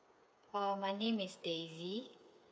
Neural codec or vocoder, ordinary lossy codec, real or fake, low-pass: codec, 16 kHz, 8 kbps, FreqCodec, smaller model; none; fake; none